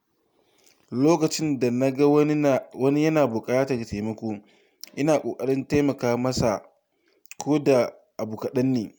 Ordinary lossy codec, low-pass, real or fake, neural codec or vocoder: none; none; real; none